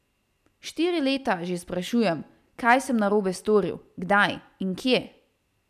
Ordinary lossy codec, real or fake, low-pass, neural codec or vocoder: none; real; 14.4 kHz; none